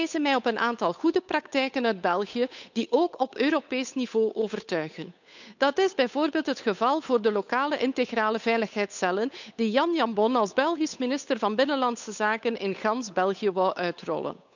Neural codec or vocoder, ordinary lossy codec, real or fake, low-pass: codec, 16 kHz, 8 kbps, FunCodec, trained on Chinese and English, 25 frames a second; none; fake; 7.2 kHz